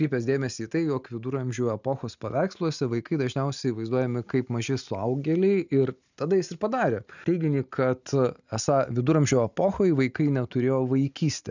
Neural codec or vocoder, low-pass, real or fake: none; 7.2 kHz; real